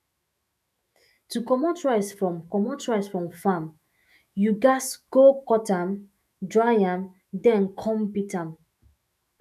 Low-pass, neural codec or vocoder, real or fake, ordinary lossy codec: 14.4 kHz; autoencoder, 48 kHz, 128 numbers a frame, DAC-VAE, trained on Japanese speech; fake; none